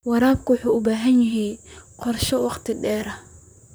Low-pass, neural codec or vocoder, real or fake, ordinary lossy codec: none; vocoder, 44.1 kHz, 128 mel bands, Pupu-Vocoder; fake; none